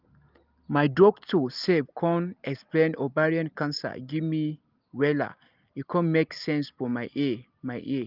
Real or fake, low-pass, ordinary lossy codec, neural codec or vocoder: real; 5.4 kHz; Opus, 32 kbps; none